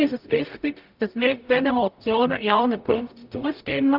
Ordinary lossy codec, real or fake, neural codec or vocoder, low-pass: Opus, 24 kbps; fake; codec, 44.1 kHz, 0.9 kbps, DAC; 5.4 kHz